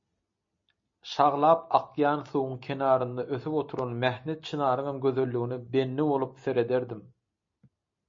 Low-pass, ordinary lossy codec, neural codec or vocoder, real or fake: 7.2 kHz; MP3, 32 kbps; none; real